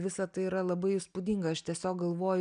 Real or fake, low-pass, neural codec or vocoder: real; 9.9 kHz; none